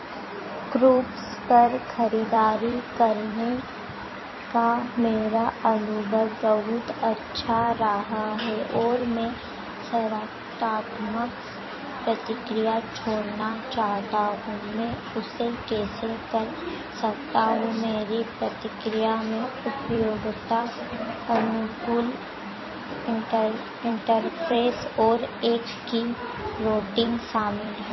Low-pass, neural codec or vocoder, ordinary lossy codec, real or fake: 7.2 kHz; vocoder, 44.1 kHz, 128 mel bands every 256 samples, BigVGAN v2; MP3, 24 kbps; fake